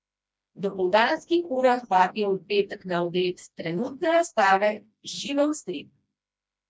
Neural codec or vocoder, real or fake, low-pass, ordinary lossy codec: codec, 16 kHz, 1 kbps, FreqCodec, smaller model; fake; none; none